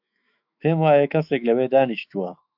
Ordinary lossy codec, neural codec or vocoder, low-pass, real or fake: AAC, 48 kbps; autoencoder, 48 kHz, 128 numbers a frame, DAC-VAE, trained on Japanese speech; 5.4 kHz; fake